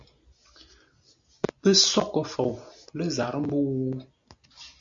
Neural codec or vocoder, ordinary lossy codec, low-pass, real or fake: none; AAC, 64 kbps; 7.2 kHz; real